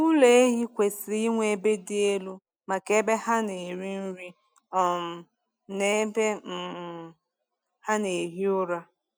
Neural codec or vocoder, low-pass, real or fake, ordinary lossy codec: none; none; real; none